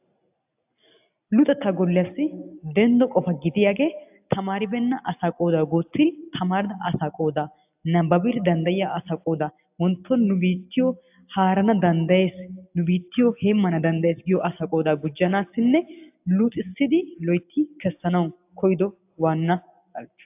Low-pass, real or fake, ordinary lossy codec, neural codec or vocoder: 3.6 kHz; real; AAC, 32 kbps; none